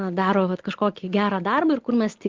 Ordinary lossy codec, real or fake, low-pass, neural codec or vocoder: Opus, 16 kbps; real; 7.2 kHz; none